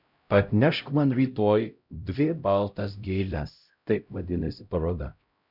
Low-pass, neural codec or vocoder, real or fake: 5.4 kHz; codec, 16 kHz, 0.5 kbps, X-Codec, HuBERT features, trained on LibriSpeech; fake